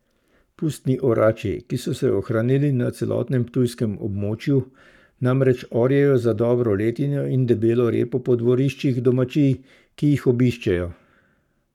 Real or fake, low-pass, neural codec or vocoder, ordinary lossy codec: fake; 19.8 kHz; codec, 44.1 kHz, 7.8 kbps, Pupu-Codec; none